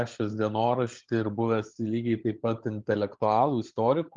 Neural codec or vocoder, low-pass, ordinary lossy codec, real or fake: codec, 16 kHz, 16 kbps, FunCodec, trained on LibriTTS, 50 frames a second; 7.2 kHz; Opus, 32 kbps; fake